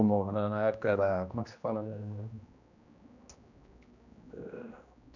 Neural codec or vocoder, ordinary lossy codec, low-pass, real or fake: codec, 16 kHz, 1 kbps, X-Codec, HuBERT features, trained on general audio; none; 7.2 kHz; fake